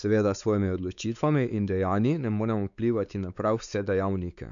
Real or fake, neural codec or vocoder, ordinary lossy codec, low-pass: fake; codec, 16 kHz, 4 kbps, X-Codec, WavLM features, trained on Multilingual LibriSpeech; none; 7.2 kHz